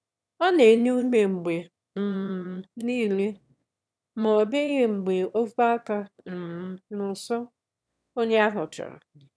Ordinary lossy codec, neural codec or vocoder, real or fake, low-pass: none; autoencoder, 22.05 kHz, a latent of 192 numbers a frame, VITS, trained on one speaker; fake; none